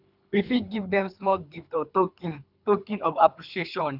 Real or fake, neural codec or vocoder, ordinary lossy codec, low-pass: fake; codec, 24 kHz, 3 kbps, HILCodec; none; 5.4 kHz